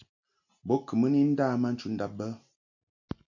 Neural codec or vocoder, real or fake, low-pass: none; real; 7.2 kHz